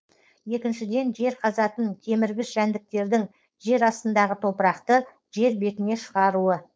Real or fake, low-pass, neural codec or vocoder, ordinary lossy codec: fake; none; codec, 16 kHz, 4.8 kbps, FACodec; none